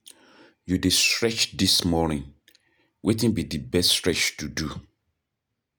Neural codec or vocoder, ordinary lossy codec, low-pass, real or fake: none; none; none; real